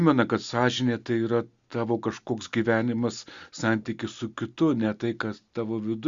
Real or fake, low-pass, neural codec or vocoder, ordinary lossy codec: real; 7.2 kHz; none; Opus, 64 kbps